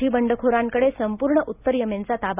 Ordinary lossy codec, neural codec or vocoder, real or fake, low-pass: none; none; real; 3.6 kHz